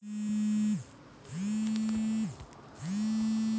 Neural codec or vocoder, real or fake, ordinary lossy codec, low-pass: none; real; none; none